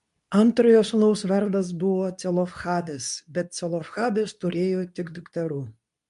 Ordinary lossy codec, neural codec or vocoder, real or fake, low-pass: MP3, 64 kbps; codec, 24 kHz, 0.9 kbps, WavTokenizer, medium speech release version 2; fake; 10.8 kHz